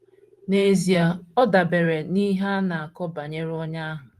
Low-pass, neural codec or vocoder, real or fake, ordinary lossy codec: 14.4 kHz; vocoder, 44.1 kHz, 128 mel bands every 512 samples, BigVGAN v2; fake; Opus, 24 kbps